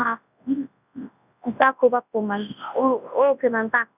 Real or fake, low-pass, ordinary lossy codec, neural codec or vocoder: fake; 3.6 kHz; none; codec, 24 kHz, 0.9 kbps, WavTokenizer, large speech release